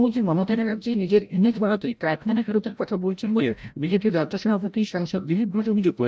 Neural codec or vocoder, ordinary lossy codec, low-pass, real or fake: codec, 16 kHz, 0.5 kbps, FreqCodec, larger model; none; none; fake